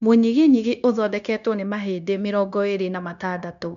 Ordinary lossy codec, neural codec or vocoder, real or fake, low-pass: none; codec, 16 kHz, 0.9 kbps, LongCat-Audio-Codec; fake; 7.2 kHz